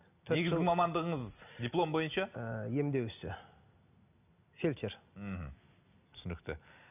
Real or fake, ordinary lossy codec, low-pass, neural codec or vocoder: real; none; 3.6 kHz; none